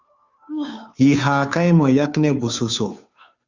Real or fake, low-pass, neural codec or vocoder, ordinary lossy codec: fake; 7.2 kHz; codec, 16 kHz, 2 kbps, FunCodec, trained on Chinese and English, 25 frames a second; Opus, 64 kbps